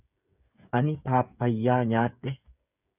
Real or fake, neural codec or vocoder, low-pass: fake; codec, 16 kHz, 8 kbps, FreqCodec, smaller model; 3.6 kHz